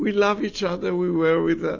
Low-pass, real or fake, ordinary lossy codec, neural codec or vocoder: 7.2 kHz; real; AAC, 48 kbps; none